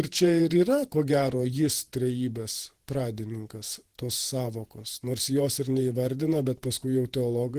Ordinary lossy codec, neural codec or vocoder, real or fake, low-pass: Opus, 16 kbps; vocoder, 48 kHz, 128 mel bands, Vocos; fake; 14.4 kHz